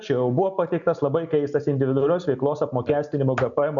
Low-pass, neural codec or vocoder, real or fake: 7.2 kHz; none; real